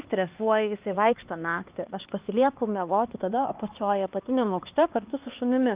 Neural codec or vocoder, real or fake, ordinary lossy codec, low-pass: codec, 16 kHz, 2 kbps, X-Codec, HuBERT features, trained on LibriSpeech; fake; Opus, 32 kbps; 3.6 kHz